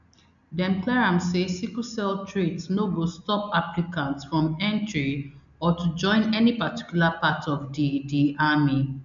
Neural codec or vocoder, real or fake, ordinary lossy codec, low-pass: none; real; none; 7.2 kHz